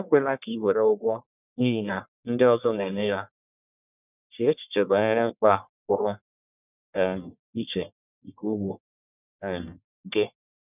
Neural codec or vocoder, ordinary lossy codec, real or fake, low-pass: codec, 44.1 kHz, 1.7 kbps, Pupu-Codec; none; fake; 3.6 kHz